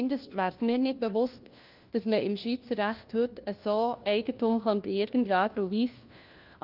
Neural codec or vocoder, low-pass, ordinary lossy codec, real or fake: codec, 16 kHz, 1 kbps, FunCodec, trained on LibriTTS, 50 frames a second; 5.4 kHz; Opus, 24 kbps; fake